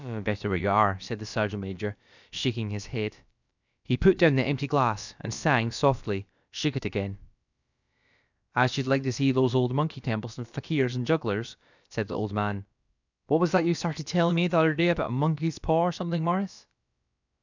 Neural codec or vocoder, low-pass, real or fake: codec, 16 kHz, about 1 kbps, DyCAST, with the encoder's durations; 7.2 kHz; fake